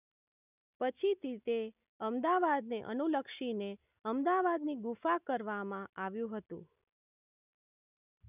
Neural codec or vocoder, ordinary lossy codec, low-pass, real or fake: none; none; 3.6 kHz; real